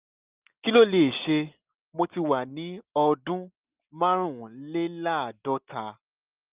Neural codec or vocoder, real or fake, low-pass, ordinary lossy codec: none; real; 3.6 kHz; Opus, 24 kbps